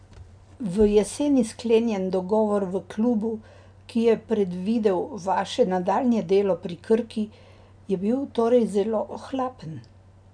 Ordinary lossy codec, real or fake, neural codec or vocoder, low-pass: none; real; none; 9.9 kHz